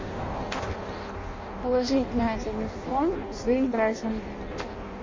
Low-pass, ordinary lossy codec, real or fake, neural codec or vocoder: 7.2 kHz; MP3, 32 kbps; fake; codec, 16 kHz in and 24 kHz out, 0.6 kbps, FireRedTTS-2 codec